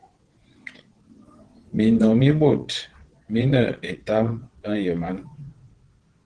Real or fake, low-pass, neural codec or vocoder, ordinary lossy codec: fake; 9.9 kHz; vocoder, 22.05 kHz, 80 mel bands, WaveNeXt; Opus, 16 kbps